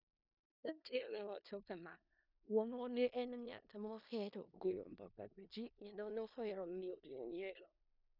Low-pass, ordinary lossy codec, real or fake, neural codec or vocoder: 5.4 kHz; none; fake; codec, 16 kHz in and 24 kHz out, 0.4 kbps, LongCat-Audio-Codec, four codebook decoder